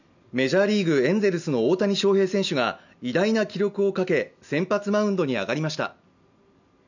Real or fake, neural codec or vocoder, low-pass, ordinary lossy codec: real; none; 7.2 kHz; none